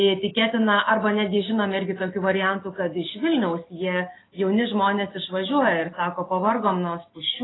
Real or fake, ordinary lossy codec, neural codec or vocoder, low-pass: real; AAC, 16 kbps; none; 7.2 kHz